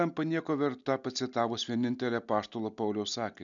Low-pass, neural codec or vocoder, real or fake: 7.2 kHz; none; real